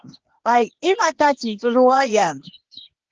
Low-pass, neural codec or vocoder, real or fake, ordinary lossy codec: 7.2 kHz; codec, 16 kHz, 0.8 kbps, ZipCodec; fake; Opus, 16 kbps